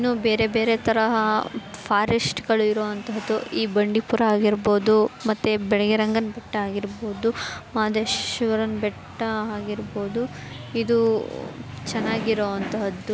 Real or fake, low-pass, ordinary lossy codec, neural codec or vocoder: real; none; none; none